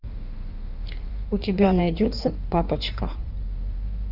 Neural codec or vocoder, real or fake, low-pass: codec, 16 kHz in and 24 kHz out, 1.1 kbps, FireRedTTS-2 codec; fake; 5.4 kHz